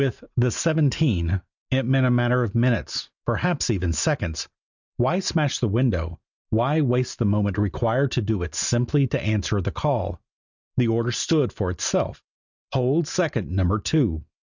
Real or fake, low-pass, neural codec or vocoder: real; 7.2 kHz; none